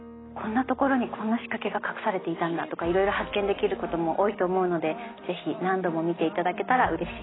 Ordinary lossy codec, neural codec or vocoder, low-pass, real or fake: AAC, 16 kbps; none; 7.2 kHz; real